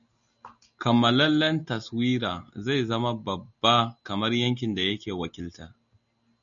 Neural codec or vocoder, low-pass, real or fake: none; 7.2 kHz; real